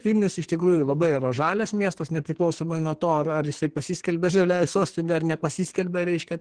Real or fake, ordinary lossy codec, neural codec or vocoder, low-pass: fake; Opus, 16 kbps; codec, 44.1 kHz, 2.6 kbps, SNAC; 9.9 kHz